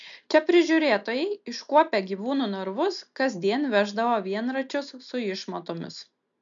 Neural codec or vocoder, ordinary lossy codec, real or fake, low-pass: none; MP3, 96 kbps; real; 7.2 kHz